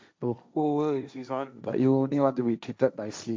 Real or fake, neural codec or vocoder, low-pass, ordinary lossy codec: fake; codec, 16 kHz, 1.1 kbps, Voila-Tokenizer; none; none